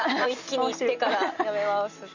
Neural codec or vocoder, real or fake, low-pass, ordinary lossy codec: none; real; 7.2 kHz; none